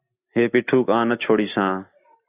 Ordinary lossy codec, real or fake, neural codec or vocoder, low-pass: Opus, 64 kbps; real; none; 3.6 kHz